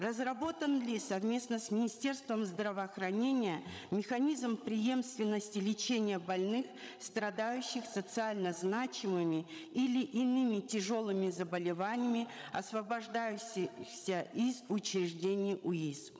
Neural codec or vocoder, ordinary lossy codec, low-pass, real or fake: codec, 16 kHz, 16 kbps, FreqCodec, larger model; none; none; fake